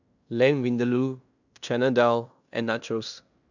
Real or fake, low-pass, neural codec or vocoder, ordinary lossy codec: fake; 7.2 kHz; codec, 16 kHz in and 24 kHz out, 0.9 kbps, LongCat-Audio-Codec, fine tuned four codebook decoder; none